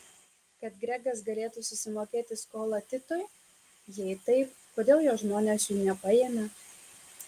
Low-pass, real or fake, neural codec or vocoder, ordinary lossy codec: 14.4 kHz; real; none; Opus, 32 kbps